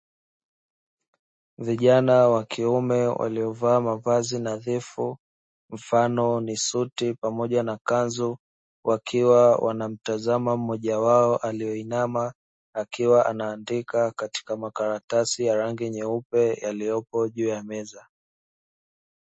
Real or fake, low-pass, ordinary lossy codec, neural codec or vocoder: real; 9.9 kHz; MP3, 32 kbps; none